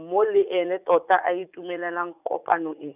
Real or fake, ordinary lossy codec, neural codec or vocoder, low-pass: fake; none; vocoder, 44.1 kHz, 80 mel bands, Vocos; 3.6 kHz